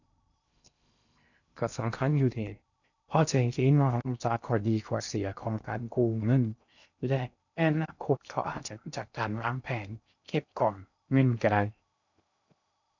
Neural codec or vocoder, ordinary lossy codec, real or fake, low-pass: codec, 16 kHz in and 24 kHz out, 0.6 kbps, FocalCodec, streaming, 2048 codes; none; fake; 7.2 kHz